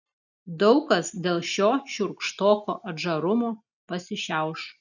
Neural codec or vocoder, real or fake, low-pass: none; real; 7.2 kHz